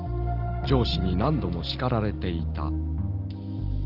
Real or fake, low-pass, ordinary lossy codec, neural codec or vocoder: real; 5.4 kHz; Opus, 16 kbps; none